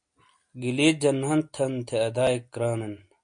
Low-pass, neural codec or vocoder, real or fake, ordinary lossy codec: 9.9 kHz; none; real; MP3, 48 kbps